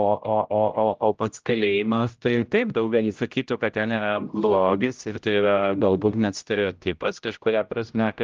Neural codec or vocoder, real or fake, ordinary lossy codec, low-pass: codec, 16 kHz, 0.5 kbps, X-Codec, HuBERT features, trained on general audio; fake; Opus, 24 kbps; 7.2 kHz